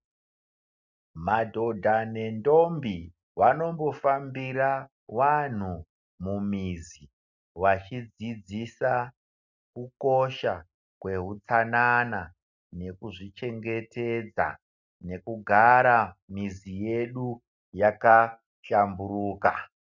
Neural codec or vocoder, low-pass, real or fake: none; 7.2 kHz; real